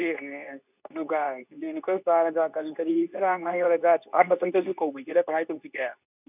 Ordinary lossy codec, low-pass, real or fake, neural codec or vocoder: none; 3.6 kHz; fake; codec, 24 kHz, 0.9 kbps, WavTokenizer, medium speech release version 2